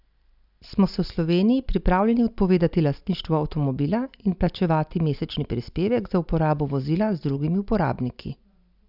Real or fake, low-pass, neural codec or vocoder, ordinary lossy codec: real; 5.4 kHz; none; none